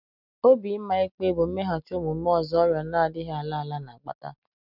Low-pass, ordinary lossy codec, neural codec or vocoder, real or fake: 5.4 kHz; none; none; real